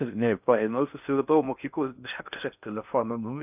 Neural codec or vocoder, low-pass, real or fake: codec, 16 kHz in and 24 kHz out, 0.6 kbps, FocalCodec, streaming, 4096 codes; 3.6 kHz; fake